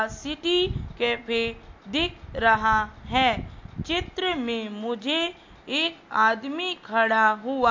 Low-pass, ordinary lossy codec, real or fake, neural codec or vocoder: 7.2 kHz; MP3, 48 kbps; real; none